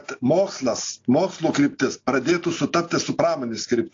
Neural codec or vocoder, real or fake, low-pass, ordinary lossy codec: none; real; 7.2 kHz; AAC, 48 kbps